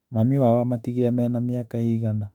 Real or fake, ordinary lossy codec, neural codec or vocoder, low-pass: fake; none; autoencoder, 48 kHz, 32 numbers a frame, DAC-VAE, trained on Japanese speech; 19.8 kHz